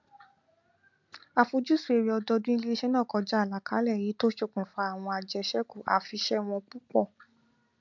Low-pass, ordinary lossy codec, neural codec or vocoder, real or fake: 7.2 kHz; MP3, 64 kbps; none; real